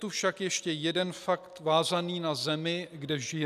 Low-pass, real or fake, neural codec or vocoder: 14.4 kHz; real; none